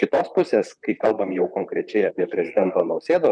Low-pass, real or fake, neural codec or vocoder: 9.9 kHz; fake; vocoder, 44.1 kHz, 128 mel bands, Pupu-Vocoder